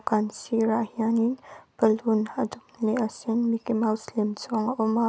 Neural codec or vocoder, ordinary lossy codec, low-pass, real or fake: none; none; none; real